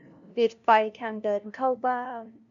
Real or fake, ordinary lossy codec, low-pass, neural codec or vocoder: fake; none; 7.2 kHz; codec, 16 kHz, 0.5 kbps, FunCodec, trained on LibriTTS, 25 frames a second